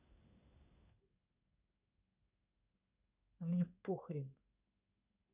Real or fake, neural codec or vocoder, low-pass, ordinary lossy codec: fake; codec, 16 kHz in and 24 kHz out, 1 kbps, XY-Tokenizer; 3.6 kHz; none